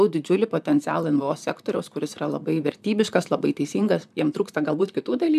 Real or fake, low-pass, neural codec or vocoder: fake; 14.4 kHz; vocoder, 44.1 kHz, 128 mel bands, Pupu-Vocoder